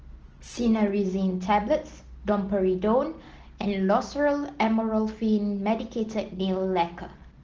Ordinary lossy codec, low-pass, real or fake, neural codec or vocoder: Opus, 16 kbps; 7.2 kHz; real; none